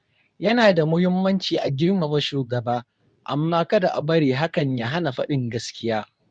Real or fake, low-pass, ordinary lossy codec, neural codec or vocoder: fake; 9.9 kHz; none; codec, 24 kHz, 0.9 kbps, WavTokenizer, medium speech release version 2